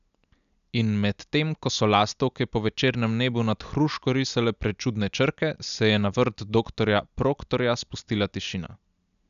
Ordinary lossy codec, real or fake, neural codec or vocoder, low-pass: none; real; none; 7.2 kHz